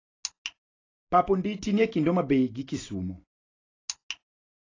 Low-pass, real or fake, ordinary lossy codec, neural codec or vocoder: 7.2 kHz; real; AAC, 32 kbps; none